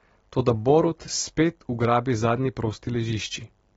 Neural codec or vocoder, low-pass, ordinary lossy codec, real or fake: none; 19.8 kHz; AAC, 24 kbps; real